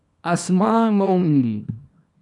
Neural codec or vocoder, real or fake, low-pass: codec, 24 kHz, 0.9 kbps, WavTokenizer, small release; fake; 10.8 kHz